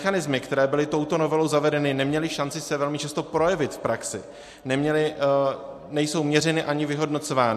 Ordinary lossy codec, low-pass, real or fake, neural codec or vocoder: MP3, 64 kbps; 14.4 kHz; real; none